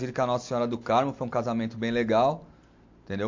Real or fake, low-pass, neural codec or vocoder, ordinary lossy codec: real; 7.2 kHz; none; MP3, 48 kbps